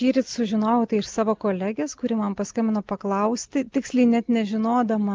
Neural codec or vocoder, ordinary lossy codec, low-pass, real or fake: none; Opus, 24 kbps; 7.2 kHz; real